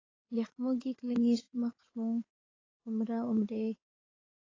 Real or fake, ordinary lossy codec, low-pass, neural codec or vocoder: fake; AAC, 32 kbps; 7.2 kHz; codec, 16 kHz in and 24 kHz out, 2.2 kbps, FireRedTTS-2 codec